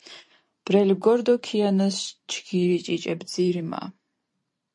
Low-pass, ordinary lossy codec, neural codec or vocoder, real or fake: 10.8 kHz; MP3, 48 kbps; vocoder, 44.1 kHz, 128 mel bands every 512 samples, BigVGAN v2; fake